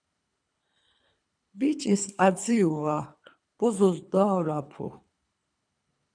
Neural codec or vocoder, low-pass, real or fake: codec, 24 kHz, 3 kbps, HILCodec; 9.9 kHz; fake